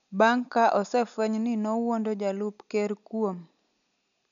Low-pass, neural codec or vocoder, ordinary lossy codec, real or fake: 7.2 kHz; none; none; real